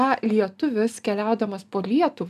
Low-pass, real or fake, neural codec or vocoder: 14.4 kHz; real; none